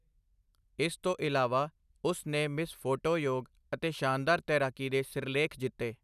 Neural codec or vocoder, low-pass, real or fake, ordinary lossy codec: none; 14.4 kHz; real; none